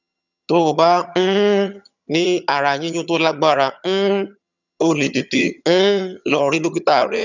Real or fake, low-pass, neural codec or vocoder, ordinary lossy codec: fake; 7.2 kHz; vocoder, 22.05 kHz, 80 mel bands, HiFi-GAN; none